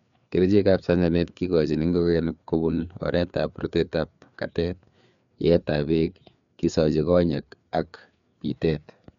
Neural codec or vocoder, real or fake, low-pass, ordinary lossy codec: codec, 16 kHz, 4 kbps, FreqCodec, larger model; fake; 7.2 kHz; none